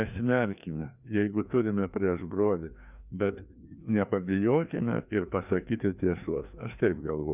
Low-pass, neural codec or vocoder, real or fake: 3.6 kHz; codec, 16 kHz, 2 kbps, FreqCodec, larger model; fake